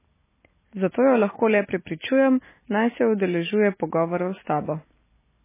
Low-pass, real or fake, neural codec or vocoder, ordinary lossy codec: 3.6 kHz; real; none; MP3, 16 kbps